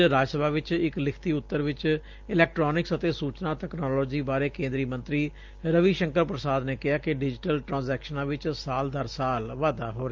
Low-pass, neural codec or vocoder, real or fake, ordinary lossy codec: 7.2 kHz; none; real; Opus, 24 kbps